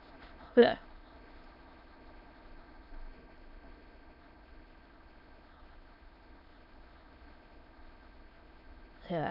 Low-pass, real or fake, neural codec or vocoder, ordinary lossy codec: 5.4 kHz; fake; autoencoder, 22.05 kHz, a latent of 192 numbers a frame, VITS, trained on many speakers; none